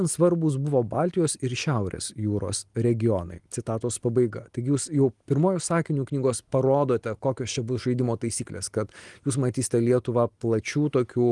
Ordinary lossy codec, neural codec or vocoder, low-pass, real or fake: Opus, 24 kbps; none; 10.8 kHz; real